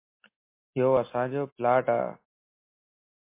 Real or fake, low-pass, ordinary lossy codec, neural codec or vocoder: real; 3.6 kHz; MP3, 24 kbps; none